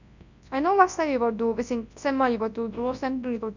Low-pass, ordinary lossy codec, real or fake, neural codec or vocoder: 7.2 kHz; none; fake; codec, 24 kHz, 0.9 kbps, WavTokenizer, large speech release